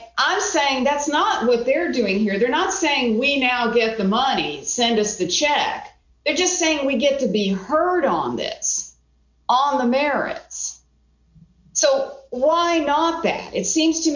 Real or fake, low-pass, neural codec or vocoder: real; 7.2 kHz; none